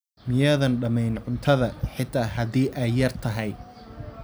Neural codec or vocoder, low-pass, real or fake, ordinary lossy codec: none; none; real; none